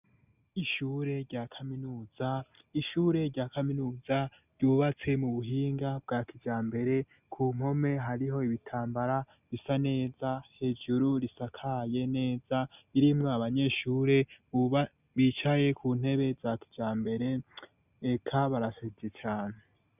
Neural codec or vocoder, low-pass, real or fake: none; 3.6 kHz; real